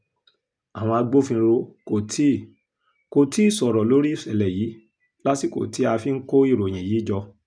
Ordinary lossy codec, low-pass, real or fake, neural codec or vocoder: none; 9.9 kHz; real; none